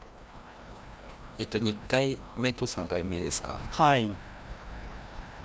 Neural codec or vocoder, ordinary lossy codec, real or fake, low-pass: codec, 16 kHz, 1 kbps, FreqCodec, larger model; none; fake; none